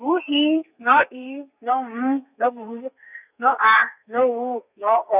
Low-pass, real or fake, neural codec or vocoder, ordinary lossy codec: 3.6 kHz; fake; codec, 32 kHz, 1.9 kbps, SNAC; none